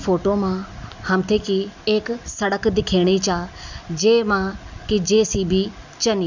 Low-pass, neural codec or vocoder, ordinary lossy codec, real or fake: 7.2 kHz; none; none; real